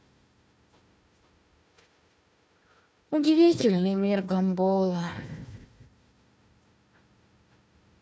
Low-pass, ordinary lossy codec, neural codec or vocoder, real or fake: none; none; codec, 16 kHz, 1 kbps, FunCodec, trained on Chinese and English, 50 frames a second; fake